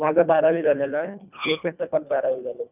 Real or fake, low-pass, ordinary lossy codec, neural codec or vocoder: fake; 3.6 kHz; none; codec, 24 kHz, 1.5 kbps, HILCodec